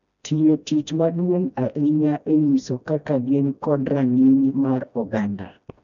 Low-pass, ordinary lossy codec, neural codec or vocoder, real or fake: 7.2 kHz; none; codec, 16 kHz, 1 kbps, FreqCodec, smaller model; fake